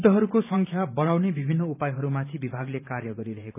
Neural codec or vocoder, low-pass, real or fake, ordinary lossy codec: vocoder, 44.1 kHz, 128 mel bands every 512 samples, BigVGAN v2; 3.6 kHz; fake; none